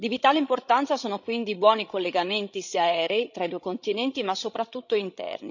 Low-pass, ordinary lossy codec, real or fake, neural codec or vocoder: 7.2 kHz; none; fake; codec, 16 kHz, 16 kbps, FreqCodec, larger model